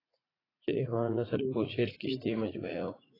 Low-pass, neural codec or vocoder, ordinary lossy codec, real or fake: 5.4 kHz; vocoder, 44.1 kHz, 80 mel bands, Vocos; AAC, 24 kbps; fake